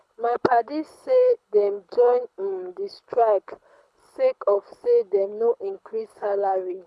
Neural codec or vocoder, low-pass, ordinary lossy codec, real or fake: codec, 24 kHz, 6 kbps, HILCodec; none; none; fake